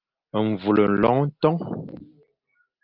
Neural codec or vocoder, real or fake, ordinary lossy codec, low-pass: none; real; Opus, 24 kbps; 5.4 kHz